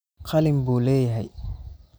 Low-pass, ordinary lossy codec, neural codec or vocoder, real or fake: none; none; none; real